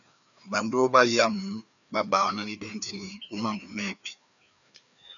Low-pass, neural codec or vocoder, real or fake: 7.2 kHz; codec, 16 kHz, 2 kbps, FreqCodec, larger model; fake